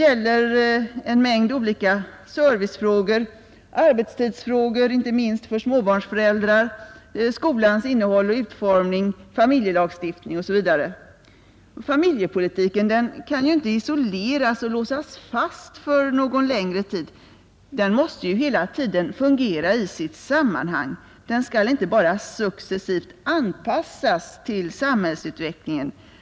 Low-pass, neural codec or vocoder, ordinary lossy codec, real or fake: none; none; none; real